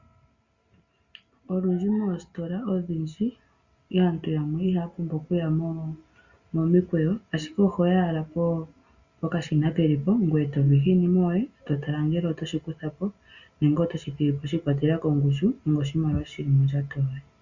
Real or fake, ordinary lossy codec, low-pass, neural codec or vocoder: real; Opus, 64 kbps; 7.2 kHz; none